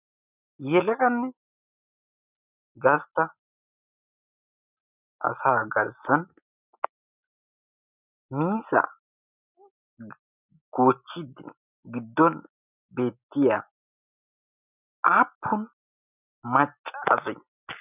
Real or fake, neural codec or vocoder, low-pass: real; none; 3.6 kHz